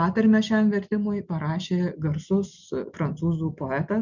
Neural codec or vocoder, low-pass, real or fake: none; 7.2 kHz; real